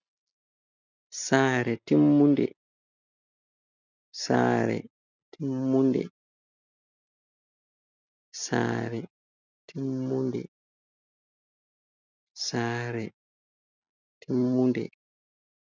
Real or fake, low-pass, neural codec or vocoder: real; 7.2 kHz; none